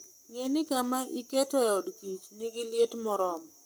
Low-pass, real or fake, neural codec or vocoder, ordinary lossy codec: none; fake; codec, 44.1 kHz, 7.8 kbps, Pupu-Codec; none